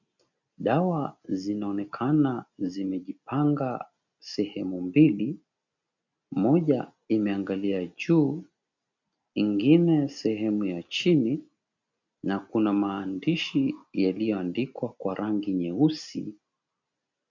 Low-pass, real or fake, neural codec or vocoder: 7.2 kHz; real; none